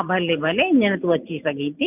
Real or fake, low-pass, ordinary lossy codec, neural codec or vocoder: real; 3.6 kHz; none; none